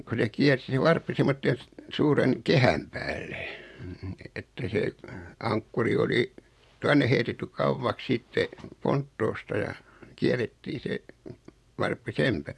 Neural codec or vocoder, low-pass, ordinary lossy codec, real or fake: vocoder, 24 kHz, 100 mel bands, Vocos; none; none; fake